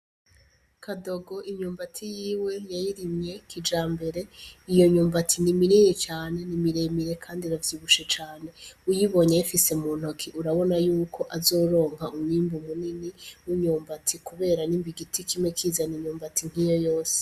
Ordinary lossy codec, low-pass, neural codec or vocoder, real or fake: Opus, 64 kbps; 14.4 kHz; none; real